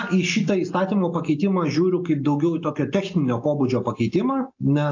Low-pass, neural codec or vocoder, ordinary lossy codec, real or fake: 7.2 kHz; none; AAC, 48 kbps; real